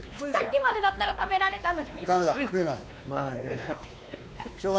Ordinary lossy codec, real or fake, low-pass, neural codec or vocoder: none; fake; none; codec, 16 kHz, 2 kbps, X-Codec, WavLM features, trained on Multilingual LibriSpeech